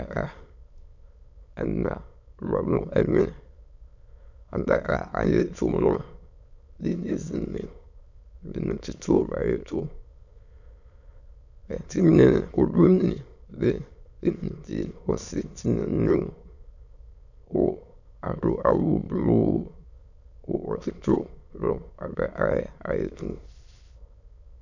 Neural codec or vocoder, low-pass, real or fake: autoencoder, 22.05 kHz, a latent of 192 numbers a frame, VITS, trained on many speakers; 7.2 kHz; fake